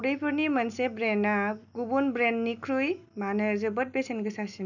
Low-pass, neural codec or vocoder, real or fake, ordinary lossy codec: 7.2 kHz; none; real; none